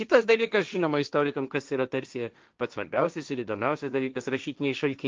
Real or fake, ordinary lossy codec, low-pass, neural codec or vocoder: fake; Opus, 24 kbps; 7.2 kHz; codec, 16 kHz, 1.1 kbps, Voila-Tokenizer